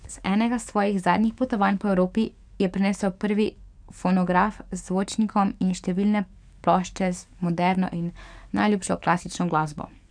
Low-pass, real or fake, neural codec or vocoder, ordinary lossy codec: 9.9 kHz; fake; codec, 44.1 kHz, 7.8 kbps, DAC; none